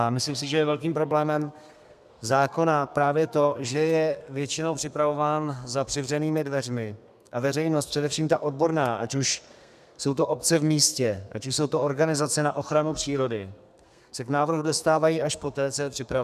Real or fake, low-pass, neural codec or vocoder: fake; 14.4 kHz; codec, 32 kHz, 1.9 kbps, SNAC